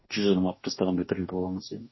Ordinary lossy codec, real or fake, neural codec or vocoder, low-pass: MP3, 24 kbps; fake; codec, 44.1 kHz, 2.6 kbps, DAC; 7.2 kHz